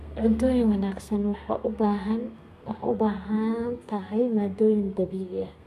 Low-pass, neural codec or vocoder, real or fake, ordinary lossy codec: 14.4 kHz; codec, 32 kHz, 1.9 kbps, SNAC; fake; none